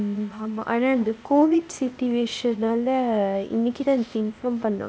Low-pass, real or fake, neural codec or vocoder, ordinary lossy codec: none; fake; codec, 16 kHz, 0.8 kbps, ZipCodec; none